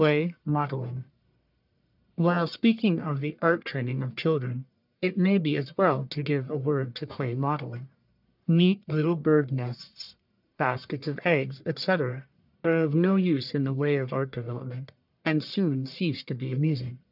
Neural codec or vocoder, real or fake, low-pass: codec, 44.1 kHz, 1.7 kbps, Pupu-Codec; fake; 5.4 kHz